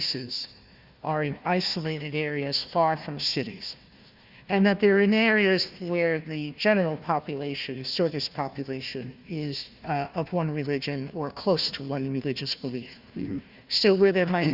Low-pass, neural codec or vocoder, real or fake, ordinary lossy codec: 5.4 kHz; codec, 16 kHz, 1 kbps, FunCodec, trained on Chinese and English, 50 frames a second; fake; Opus, 64 kbps